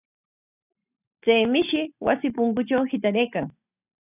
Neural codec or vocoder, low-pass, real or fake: none; 3.6 kHz; real